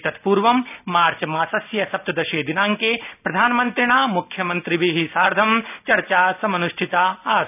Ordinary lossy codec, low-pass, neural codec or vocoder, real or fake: none; 3.6 kHz; none; real